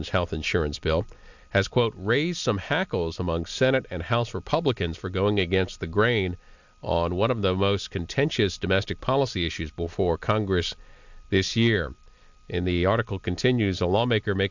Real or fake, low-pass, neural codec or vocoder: real; 7.2 kHz; none